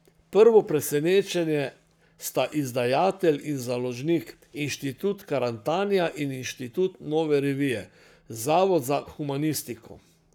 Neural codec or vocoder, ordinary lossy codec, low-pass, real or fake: codec, 44.1 kHz, 7.8 kbps, Pupu-Codec; none; none; fake